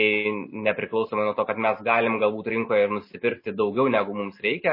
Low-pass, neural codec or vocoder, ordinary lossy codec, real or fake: 5.4 kHz; none; MP3, 24 kbps; real